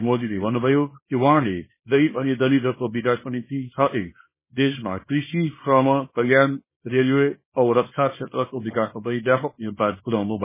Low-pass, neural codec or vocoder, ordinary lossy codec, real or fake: 3.6 kHz; codec, 24 kHz, 0.9 kbps, WavTokenizer, small release; MP3, 16 kbps; fake